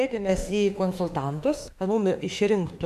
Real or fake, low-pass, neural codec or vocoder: fake; 14.4 kHz; autoencoder, 48 kHz, 32 numbers a frame, DAC-VAE, trained on Japanese speech